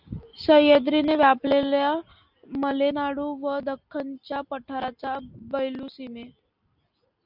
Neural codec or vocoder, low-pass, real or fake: none; 5.4 kHz; real